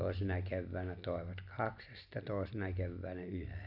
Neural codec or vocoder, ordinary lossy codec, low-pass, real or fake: none; AAC, 48 kbps; 5.4 kHz; real